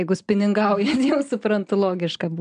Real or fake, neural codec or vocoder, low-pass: real; none; 9.9 kHz